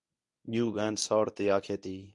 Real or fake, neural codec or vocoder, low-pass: fake; codec, 24 kHz, 0.9 kbps, WavTokenizer, medium speech release version 1; 10.8 kHz